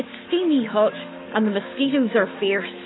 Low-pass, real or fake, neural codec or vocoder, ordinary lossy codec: 7.2 kHz; fake; autoencoder, 48 kHz, 128 numbers a frame, DAC-VAE, trained on Japanese speech; AAC, 16 kbps